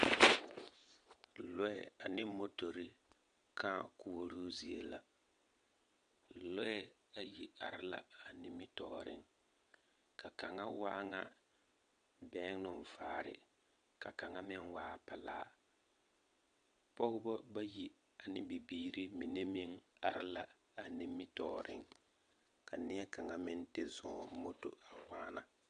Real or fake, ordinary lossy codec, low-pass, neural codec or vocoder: fake; MP3, 64 kbps; 9.9 kHz; vocoder, 22.05 kHz, 80 mel bands, WaveNeXt